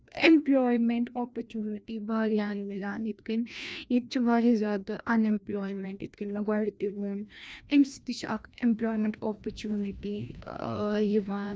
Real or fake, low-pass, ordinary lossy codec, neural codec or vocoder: fake; none; none; codec, 16 kHz, 1 kbps, FreqCodec, larger model